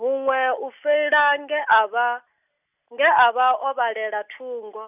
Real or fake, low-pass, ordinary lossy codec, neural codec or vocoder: real; 3.6 kHz; none; none